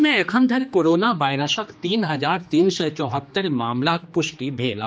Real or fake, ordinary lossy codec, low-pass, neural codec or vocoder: fake; none; none; codec, 16 kHz, 2 kbps, X-Codec, HuBERT features, trained on general audio